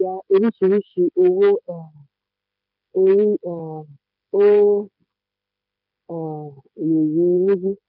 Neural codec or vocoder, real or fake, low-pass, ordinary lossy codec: codec, 16 kHz, 8 kbps, FreqCodec, smaller model; fake; 5.4 kHz; none